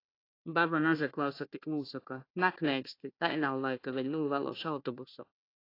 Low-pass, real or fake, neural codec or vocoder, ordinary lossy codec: 5.4 kHz; fake; codec, 16 kHz, 1 kbps, FunCodec, trained on Chinese and English, 50 frames a second; AAC, 32 kbps